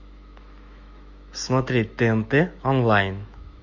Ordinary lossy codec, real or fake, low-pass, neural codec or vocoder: Opus, 64 kbps; fake; 7.2 kHz; autoencoder, 48 kHz, 128 numbers a frame, DAC-VAE, trained on Japanese speech